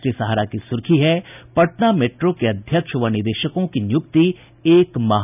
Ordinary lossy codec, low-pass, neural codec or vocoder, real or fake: none; 3.6 kHz; none; real